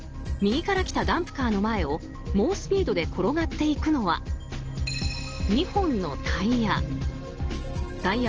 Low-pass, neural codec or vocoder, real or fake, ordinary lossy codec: 7.2 kHz; none; real; Opus, 16 kbps